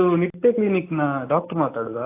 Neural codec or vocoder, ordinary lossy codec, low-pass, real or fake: none; AAC, 24 kbps; 3.6 kHz; real